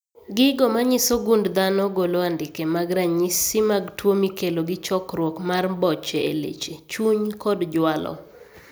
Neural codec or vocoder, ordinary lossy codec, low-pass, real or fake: none; none; none; real